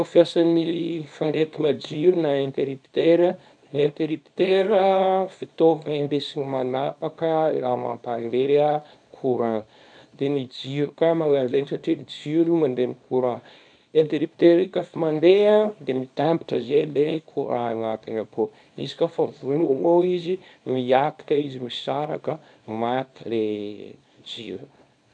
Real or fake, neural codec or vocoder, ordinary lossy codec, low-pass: fake; codec, 24 kHz, 0.9 kbps, WavTokenizer, small release; none; 9.9 kHz